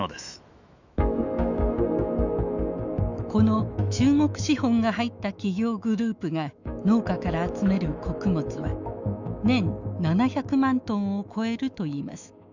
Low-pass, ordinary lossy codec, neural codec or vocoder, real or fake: 7.2 kHz; none; autoencoder, 48 kHz, 128 numbers a frame, DAC-VAE, trained on Japanese speech; fake